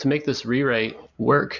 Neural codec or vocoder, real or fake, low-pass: none; real; 7.2 kHz